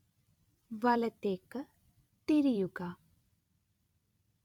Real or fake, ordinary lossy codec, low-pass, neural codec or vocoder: fake; none; 19.8 kHz; vocoder, 44.1 kHz, 128 mel bands every 256 samples, BigVGAN v2